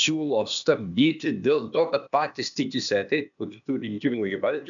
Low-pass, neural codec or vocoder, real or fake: 7.2 kHz; codec, 16 kHz, 0.8 kbps, ZipCodec; fake